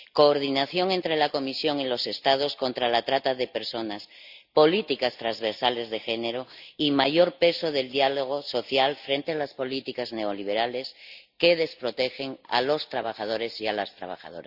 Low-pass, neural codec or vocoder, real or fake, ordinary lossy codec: 5.4 kHz; none; real; Opus, 64 kbps